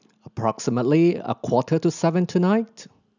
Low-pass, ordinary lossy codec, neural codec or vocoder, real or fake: 7.2 kHz; none; none; real